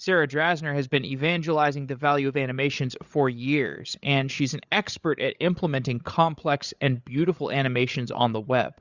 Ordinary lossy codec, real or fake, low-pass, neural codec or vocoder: Opus, 64 kbps; fake; 7.2 kHz; codec, 16 kHz, 8 kbps, FreqCodec, larger model